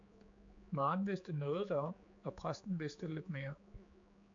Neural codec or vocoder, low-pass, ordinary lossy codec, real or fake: codec, 16 kHz, 4 kbps, X-Codec, HuBERT features, trained on general audio; 7.2 kHz; AAC, 64 kbps; fake